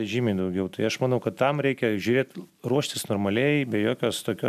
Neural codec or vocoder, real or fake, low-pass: none; real; 14.4 kHz